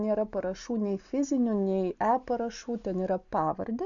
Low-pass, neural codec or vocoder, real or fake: 7.2 kHz; none; real